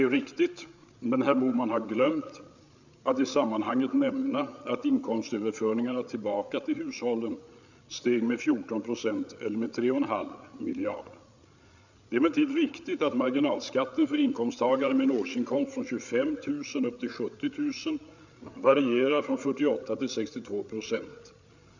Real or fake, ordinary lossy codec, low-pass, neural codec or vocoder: fake; none; 7.2 kHz; codec, 16 kHz, 8 kbps, FreqCodec, larger model